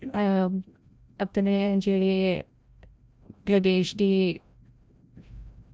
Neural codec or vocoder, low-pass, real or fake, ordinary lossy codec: codec, 16 kHz, 0.5 kbps, FreqCodec, larger model; none; fake; none